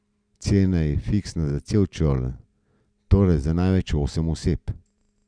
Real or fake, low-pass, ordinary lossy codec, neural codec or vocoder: real; 9.9 kHz; none; none